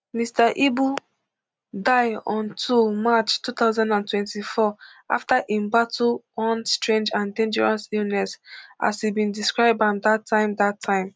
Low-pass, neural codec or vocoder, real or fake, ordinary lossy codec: none; none; real; none